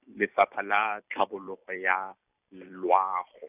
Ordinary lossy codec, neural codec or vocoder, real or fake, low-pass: none; vocoder, 44.1 kHz, 128 mel bands every 256 samples, BigVGAN v2; fake; 3.6 kHz